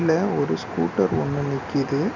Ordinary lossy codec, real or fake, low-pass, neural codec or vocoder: none; real; 7.2 kHz; none